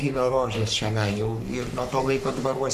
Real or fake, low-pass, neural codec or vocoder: fake; 14.4 kHz; codec, 44.1 kHz, 3.4 kbps, Pupu-Codec